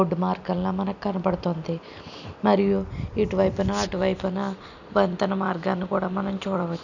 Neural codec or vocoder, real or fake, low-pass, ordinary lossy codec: none; real; 7.2 kHz; none